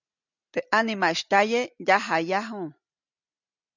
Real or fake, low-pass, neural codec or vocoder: real; 7.2 kHz; none